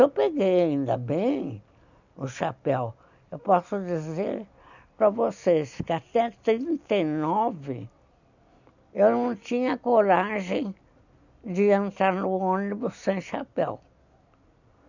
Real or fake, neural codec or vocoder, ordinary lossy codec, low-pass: real; none; none; 7.2 kHz